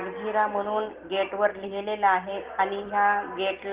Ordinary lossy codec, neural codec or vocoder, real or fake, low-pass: Opus, 16 kbps; none; real; 3.6 kHz